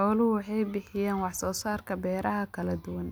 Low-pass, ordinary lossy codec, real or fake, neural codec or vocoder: none; none; real; none